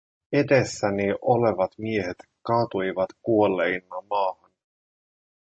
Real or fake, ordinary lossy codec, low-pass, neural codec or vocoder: real; MP3, 32 kbps; 9.9 kHz; none